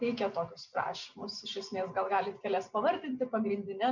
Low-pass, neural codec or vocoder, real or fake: 7.2 kHz; none; real